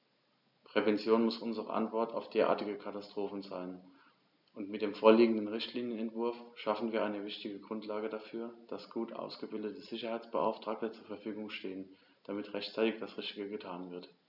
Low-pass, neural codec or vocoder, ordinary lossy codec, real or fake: 5.4 kHz; none; none; real